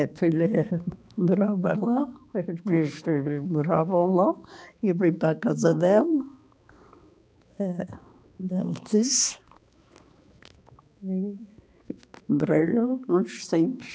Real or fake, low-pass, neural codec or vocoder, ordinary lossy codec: fake; none; codec, 16 kHz, 2 kbps, X-Codec, HuBERT features, trained on balanced general audio; none